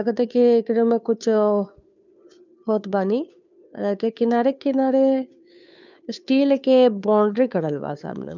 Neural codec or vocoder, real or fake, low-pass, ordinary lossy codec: codec, 16 kHz, 2 kbps, FunCodec, trained on Chinese and English, 25 frames a second; fake; 7.2 kHz; none